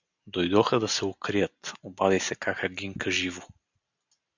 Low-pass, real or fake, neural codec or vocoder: 7.2 kHz; real; none